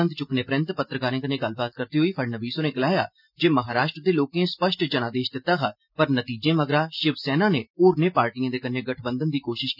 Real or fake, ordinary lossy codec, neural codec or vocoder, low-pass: real; none; none; 5.4 kHz